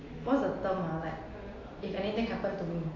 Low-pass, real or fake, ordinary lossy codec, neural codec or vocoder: 7.2 kHz; real; AAC, 32 kbps; none